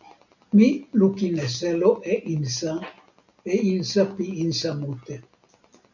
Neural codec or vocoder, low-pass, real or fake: none; 7.2 kHz; real